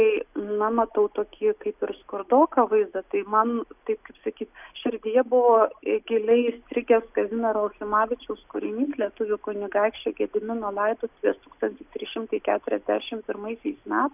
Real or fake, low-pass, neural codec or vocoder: real; 3.6 kHz; none